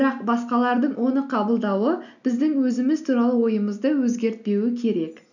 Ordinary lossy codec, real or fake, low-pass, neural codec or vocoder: none; real; 7.2 kHz; none